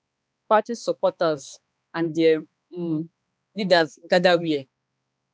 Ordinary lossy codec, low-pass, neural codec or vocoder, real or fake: none; none; codec, 16 kHz, 2 kbps, X-Codec, HuBERT features, trained on balanced general audio; fake